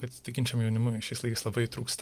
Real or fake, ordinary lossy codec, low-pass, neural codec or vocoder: real; Opus, 32 kbps; 14.4 kHz; none